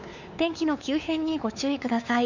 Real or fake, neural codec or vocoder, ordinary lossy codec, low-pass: fake; codec, 16 kHz, 4 kbps, X-Codec, WavLM features, trained on Multilingual LibriSpeech; none; 7.2 kHz